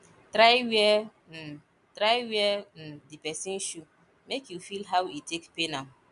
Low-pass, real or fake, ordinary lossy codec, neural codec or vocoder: 10.8 kHz; real; none; none